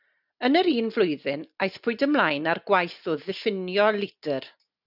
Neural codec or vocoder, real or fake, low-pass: none; real; 5.4 kHz